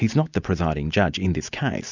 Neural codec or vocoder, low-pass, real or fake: none; 7.2 kHz; real